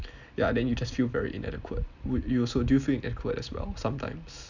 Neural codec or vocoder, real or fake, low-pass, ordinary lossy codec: none; real; 7.2 kHz; none